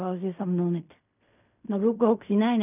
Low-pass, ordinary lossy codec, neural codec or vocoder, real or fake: 3.6 kHz; none; codec, 16 kHz in and 24 kHz out, 0.4 kbps, LongCat-Audio-Codec, fine tuned four codebook decoder; fake